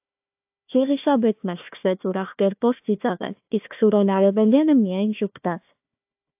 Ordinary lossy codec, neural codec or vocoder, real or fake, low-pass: AAC, 32 kbps; codec, 16 kHz, 1 kbps, FunCodec, trained on Chinese and English, 50 frames a second; fake; 3.6 kHz